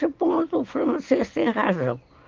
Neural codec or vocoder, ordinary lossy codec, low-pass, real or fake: none; Opus, 24 kbps; 7.2 kHz; real